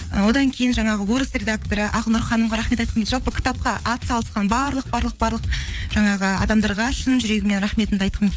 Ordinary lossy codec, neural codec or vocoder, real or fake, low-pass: none; codec, 16 kHz, 4 kbps, FreqCodec, larger model; fake; none